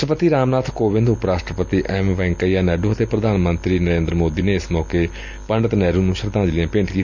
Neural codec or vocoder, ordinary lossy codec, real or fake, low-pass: none; none; real; 7.2 kHz